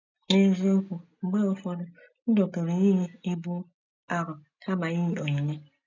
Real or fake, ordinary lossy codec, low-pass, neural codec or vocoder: real; none; 7.2 kHz; none